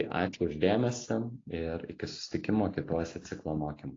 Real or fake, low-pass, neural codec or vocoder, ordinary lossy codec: real; 7.2 kHz; none; AAC, 32 kbps